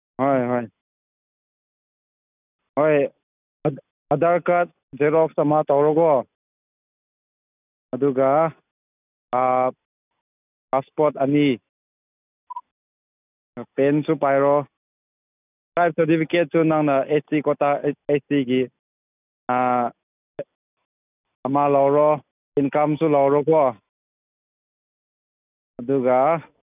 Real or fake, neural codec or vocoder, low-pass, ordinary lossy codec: real; none; 3.6 kHz; none